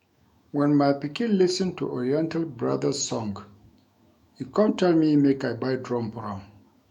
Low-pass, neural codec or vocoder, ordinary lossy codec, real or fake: 19.8 kHz; autoencoder, 48 kHz, 128 numbers a frame, DAC-VAE, trained on Japanese speech; none; fake